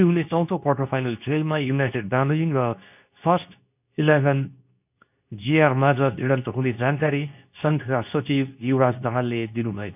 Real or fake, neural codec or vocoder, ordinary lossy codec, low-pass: fake; codec, 24 kHz, 0.9 kbps, WavTokenizer, medium speech release version 2; none; 3.6 kHz